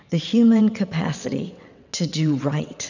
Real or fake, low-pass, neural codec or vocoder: fake; 7.2 kHz; vocoder, 22.05 kHz, 80 mel bands, WaveNeXt